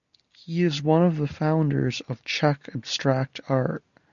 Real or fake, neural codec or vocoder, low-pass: real; none; 7.2 kHz